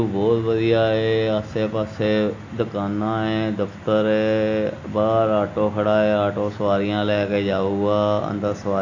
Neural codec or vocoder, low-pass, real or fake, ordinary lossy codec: none; 7.2 kHz; real; AAC, 48 kbps